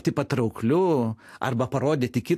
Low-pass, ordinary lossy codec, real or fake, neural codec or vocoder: 14.4 kHz; AAC, 64 kbps; real; none